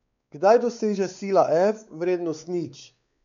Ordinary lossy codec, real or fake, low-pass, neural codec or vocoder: none; fake; 7.2 kHz; codec, 16 kHz, 4 kbps, X-Codec, WavLM features, trained on Multilingual LibriSpeech